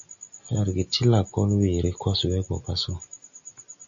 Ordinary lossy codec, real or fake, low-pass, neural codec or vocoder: MP3, 64 kbps; real; 7.2 kHz; none